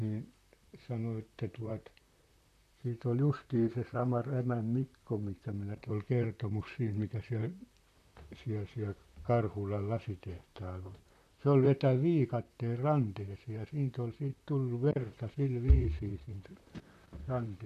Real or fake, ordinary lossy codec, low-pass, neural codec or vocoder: fake; none; 14.4 kHz; vocoder, 44.1 kHz, 128 mel bands, Pupu-Vocoder